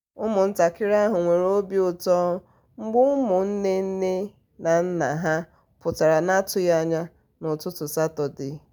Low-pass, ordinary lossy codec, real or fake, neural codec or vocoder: none; none; real; none